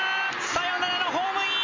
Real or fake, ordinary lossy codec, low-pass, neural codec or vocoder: real; MP3, 32 kbps; 7.2 kHz; none